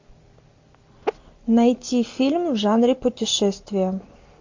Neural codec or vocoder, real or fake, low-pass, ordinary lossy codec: none; real; 7.2 kHz; MP3, 48 kbps